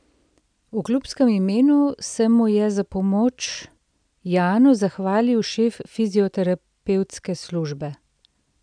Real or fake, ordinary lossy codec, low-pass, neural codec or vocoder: real; none; 9.9 kHz; none